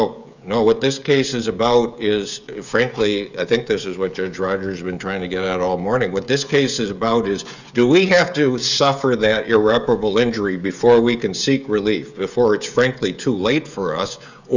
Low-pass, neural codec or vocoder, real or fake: 7.2 kHz; codec, 16 kHz, 16 kbps, FreqCodec, smaller model; fake